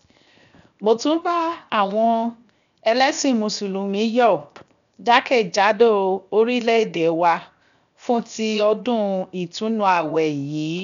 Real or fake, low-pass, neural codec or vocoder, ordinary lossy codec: fake; 7.2 kHz; codec, 16 kHz, 0.7 kbps, FocalCodec; none